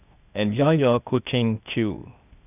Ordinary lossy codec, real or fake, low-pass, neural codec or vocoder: none; fake; 3.6 kHz; codec, 16 kHz, 0.8 kbps, ZipCodec